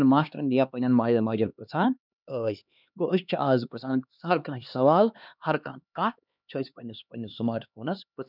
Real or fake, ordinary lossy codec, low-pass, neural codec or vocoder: fake; none; 5.4 kHz; codec, 16 kHz, 2 kbps, X-Codec, HuBERT features, trained on LibriSpeech